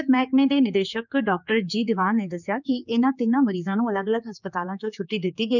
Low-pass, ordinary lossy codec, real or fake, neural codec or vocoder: 7.2 kHz; none; fake; codec, 16 kHz, 4 kbps, X-Codec, HuBERT features, trained on general audio